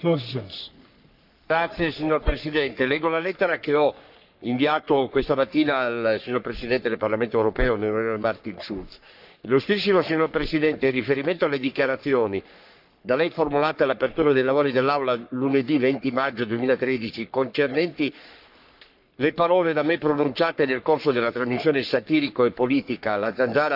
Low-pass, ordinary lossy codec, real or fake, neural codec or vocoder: 5.4 kHz; none; fake; codec, 44.1 kHz, 3.4 kbps, Pupu-Codec